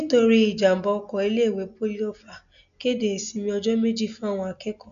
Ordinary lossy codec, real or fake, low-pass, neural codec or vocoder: none; real; 7.2 kHz; none